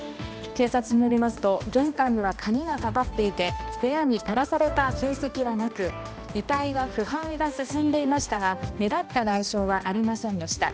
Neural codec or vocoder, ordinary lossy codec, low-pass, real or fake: codec, 16 kHz, 1 kbps, X-Codec, HuBERT features, trained on balanced general audio; none; none; fake